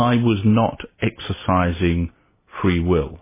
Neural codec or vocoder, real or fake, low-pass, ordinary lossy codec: none; real; 3.6 kHz; MP3, 16 kbps